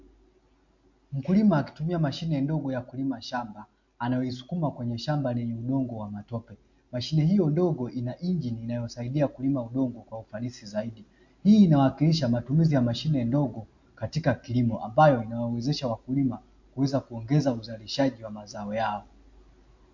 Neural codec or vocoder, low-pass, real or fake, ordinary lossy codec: none; 7.2 kHz; real; MP3, 48 kbps